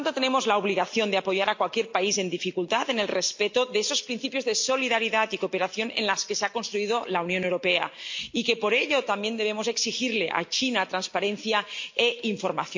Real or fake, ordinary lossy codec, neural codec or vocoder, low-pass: real; MP3, 48 kbps; none; 7.2 kHz